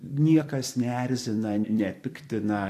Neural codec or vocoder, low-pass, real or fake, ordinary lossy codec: vocoder, 44.1 kHz, 128 mel bands every 256 samples, BigVGAN v2; 14.4 kHz; fake; AAC, 64 kbps